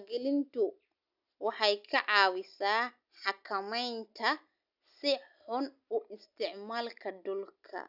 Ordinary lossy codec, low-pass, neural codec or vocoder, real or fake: none; 5.4 kHz; none; real